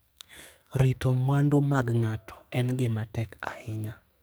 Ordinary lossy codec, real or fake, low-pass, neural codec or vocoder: none; fake; none; codec, 44.1 kHz, 2.6 kbps, SNAC